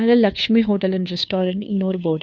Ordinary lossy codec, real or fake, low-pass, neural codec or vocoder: none; fake; none; codec, 16 kHz, 2 kbps, FunCodec, trained on Chinese and English, 25 frames a second